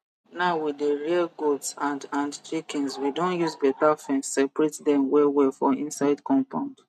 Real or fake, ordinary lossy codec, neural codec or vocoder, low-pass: real; none; none; 14.4 kHz